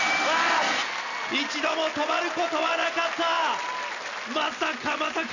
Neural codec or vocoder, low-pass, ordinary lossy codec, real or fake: none; 7.2 kHz; none; real